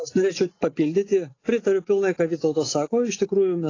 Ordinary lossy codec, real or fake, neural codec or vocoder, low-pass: AAC, 32 kbps; real; none; 7.2 kHz